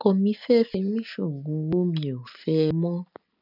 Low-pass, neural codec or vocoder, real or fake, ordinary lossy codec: 5.4 kHz; none; real; none